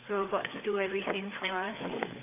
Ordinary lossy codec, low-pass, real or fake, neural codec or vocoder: AAC, 24 kbps; 3.6 kHz; fake; codec, 16 kHz, 4 kbps, FreqCodec, larger model